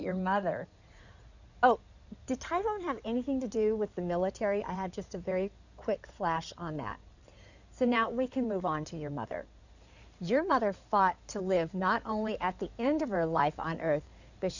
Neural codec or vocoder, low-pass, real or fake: codec, 16 kHz in and 24 kHz out, 2.2 kbps, FireRedTTS-2 codec; 7.2 kHz; fake